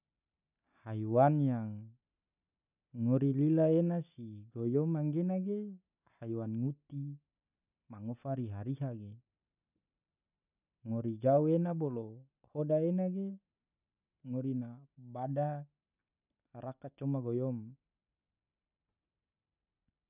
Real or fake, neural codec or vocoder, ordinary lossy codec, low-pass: real; none; none; 3.6 kHz